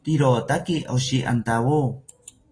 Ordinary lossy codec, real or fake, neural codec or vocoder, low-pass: AAC, 32 kbps; real; none; 9.9 kHz